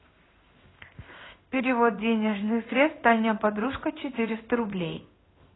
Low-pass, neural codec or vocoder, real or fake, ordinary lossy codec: 7.2 kHz; codec, 16 kHz in and 24 kHz out, 1 kbps, XY-Tokenizer; fake; AAC, 16 kbps